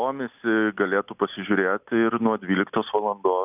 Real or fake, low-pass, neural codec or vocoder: real; 3.6 kHz; none